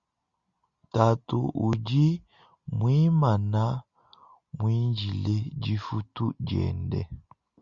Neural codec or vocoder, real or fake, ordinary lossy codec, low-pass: none; real; Opus, 64 kbps; 7.2 kHz